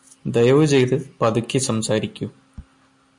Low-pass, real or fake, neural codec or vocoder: 10.8 kHz; real; none